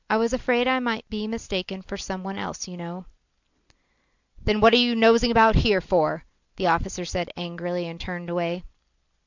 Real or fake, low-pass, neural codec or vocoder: real; 7.2 kHz; none